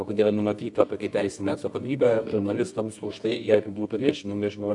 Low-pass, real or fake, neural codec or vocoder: 10.8 kHz; fake; codec, 24 kHz, 0.9 kbps, WavTokenizer, medium music audio release